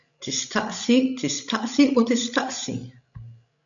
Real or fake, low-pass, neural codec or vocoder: fake; 7.2 kHz; codec, 16 kHz, 16 kbps, FreqCodec, larger model